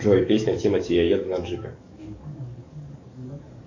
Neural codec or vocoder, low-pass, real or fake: codec, 44.1 kHz, 7.8 kbps, DAC; 7.2 kHz; fake